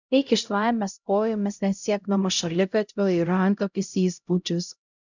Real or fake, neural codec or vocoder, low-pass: fake; codec, 16 kHz, 0.5 kbps, X-Codec, HuBERT features, trained on LibriSpeech; 7.2 kHz